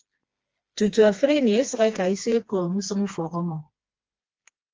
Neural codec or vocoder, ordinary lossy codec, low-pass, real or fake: codec, 16 kHz, 2 kbps, FreqCodec, smaller model; Opus, 24 kbps; 7.2 kHz; fake